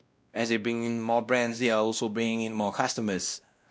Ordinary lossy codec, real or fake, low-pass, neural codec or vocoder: none; fake; none; codec, 16 kHz, 1 kbps, X-Codec, WavLM features, trained on Multilingual LibriSpeech